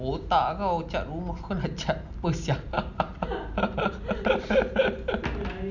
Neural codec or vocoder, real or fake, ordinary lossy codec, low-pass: none; real; none; 7.2 kHz